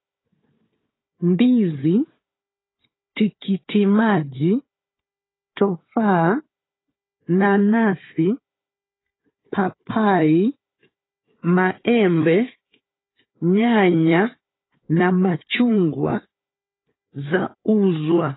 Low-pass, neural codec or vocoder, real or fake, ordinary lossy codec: 7.2 kHz; codec, 16 kHz, 4 kbps, FunCodec, trained on Chinese and English, 50 frames a second; fake; AAC, 16 kbps